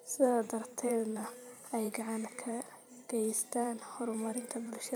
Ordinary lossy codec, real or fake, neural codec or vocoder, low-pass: none; fake; vocoder, 44.1 kHz, 128 mel bands every 512 samples, BigVGAN v2; none